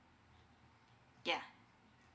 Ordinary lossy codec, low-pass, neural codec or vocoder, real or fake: none; none; none; real